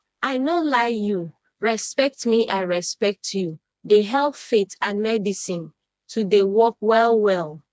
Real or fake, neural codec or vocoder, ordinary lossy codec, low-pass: fake; codec, 16 kHz, 2 kbps, FreqCodec, smaller model; none; none